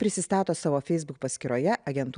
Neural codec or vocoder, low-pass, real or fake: none; 9.9 kHz; real